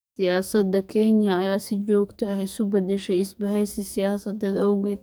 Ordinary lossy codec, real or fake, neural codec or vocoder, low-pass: none; fake; codec, 44.1 kHz, 2.6 kbps, SNAC; none